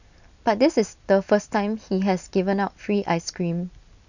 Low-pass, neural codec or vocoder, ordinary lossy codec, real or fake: 7.2 kHz; none; none; real